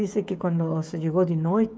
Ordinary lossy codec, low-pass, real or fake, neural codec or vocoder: none; none; fake; codec, 16 kHz, 16 kbps, FreqCodec, smaller model